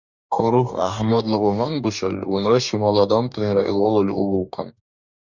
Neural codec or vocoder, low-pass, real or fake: codec, 44.1 kHz, 2.6 kbps, DAC; 7.2 kHz; fake